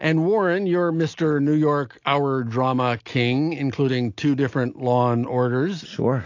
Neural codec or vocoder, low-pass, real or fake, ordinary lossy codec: none; 7.2 kHz; real; AAC, 48 kbps